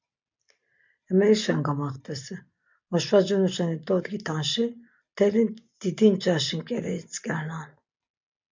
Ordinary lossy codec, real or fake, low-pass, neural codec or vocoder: MP3, 64 kbps; real; 7.2 kHz; none